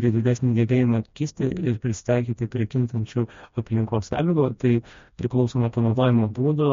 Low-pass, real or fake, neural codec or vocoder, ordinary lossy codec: 7.2 kHz; fake; codec, 16 kHz, 1 kbps, FreqCodec, smaller model; MP3, 48 kbps